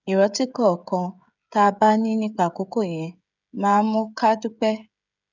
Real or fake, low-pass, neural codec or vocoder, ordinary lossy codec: fake; 7.2 kHz; codec, 16 kHz, 16 kbps, FreqCodec, smaller model; none